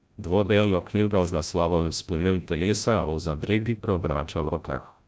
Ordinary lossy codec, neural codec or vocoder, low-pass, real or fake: none; codec, 16 kHz, 0.5 kbps, FreqCodec, larger model; none; fake